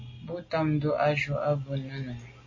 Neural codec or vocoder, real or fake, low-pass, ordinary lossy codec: none; real; 7.2 kHz; MP3, 32 kbps